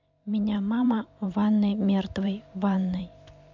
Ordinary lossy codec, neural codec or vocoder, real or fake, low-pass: MP3, 64 kbps; vocoder, 44.1 kHz, 128 mel bands every 512 samples, BigVGAN v2; fake; 7.2 kHz